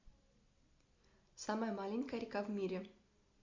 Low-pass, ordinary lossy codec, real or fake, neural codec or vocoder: 7.2 kHz; MP3, 64 kbps; real; none